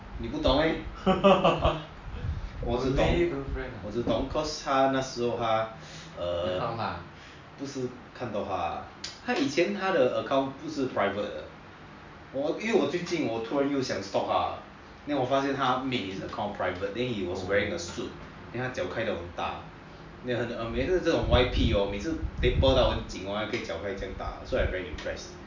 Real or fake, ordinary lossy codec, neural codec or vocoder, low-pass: real; MP3, 64 kbps; none; 7.2 kHz